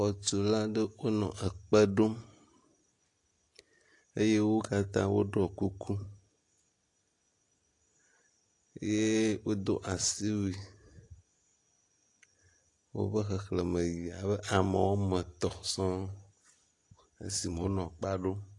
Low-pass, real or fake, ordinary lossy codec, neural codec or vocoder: 10.8 kHz; real; AAC, 48 kbps; none